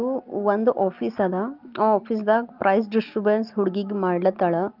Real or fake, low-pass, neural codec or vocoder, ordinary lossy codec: real; 5.4 kHz; none; Opus, 24 kbps